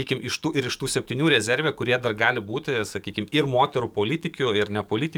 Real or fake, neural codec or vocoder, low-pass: fake; codec, 44.1 kHz, 7.8 kbps, DAC; 19.8 kHz